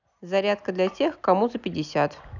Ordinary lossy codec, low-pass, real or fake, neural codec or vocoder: none; 7.2 kHz; real; none